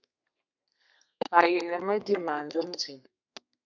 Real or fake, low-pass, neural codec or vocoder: fake; 7.2 kHz; codec, 32 kHz, 1.9 kbps, SNAC